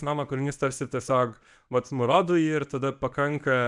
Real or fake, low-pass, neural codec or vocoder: fake; 10.8 kHz; codec, 24 kHz, 0.9 kbps, WavTokenizer, medium speech release version 1